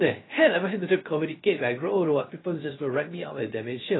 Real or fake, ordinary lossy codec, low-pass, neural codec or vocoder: fake; AAC, 16 kbps; 7.2 kHz; codec, 16 kHz, 0.3 kbps, FocalCodec